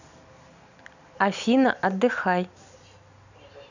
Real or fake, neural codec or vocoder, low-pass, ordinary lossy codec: real; none; 7.2 kHz; none